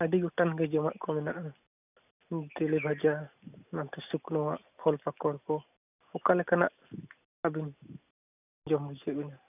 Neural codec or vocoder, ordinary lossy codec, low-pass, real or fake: none; none; 3.6 kHz; real